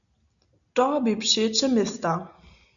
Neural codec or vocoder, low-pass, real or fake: none; 7.2 kHz; real